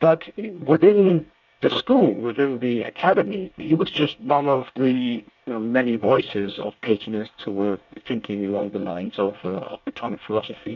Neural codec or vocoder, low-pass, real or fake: codec, 24 kHz, 1 kbps, SNAC; 7.2 kHz; fake